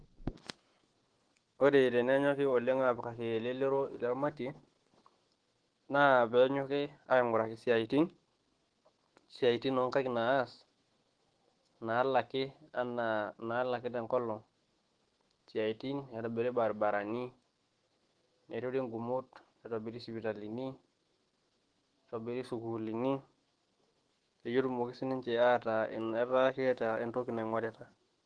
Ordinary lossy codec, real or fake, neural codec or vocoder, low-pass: Opus, 16 kbps; fake; autoencoder, 48 kHz, 128 numbers a frame, DAC-VAE, trained on Japanese speech; 9.9 kHz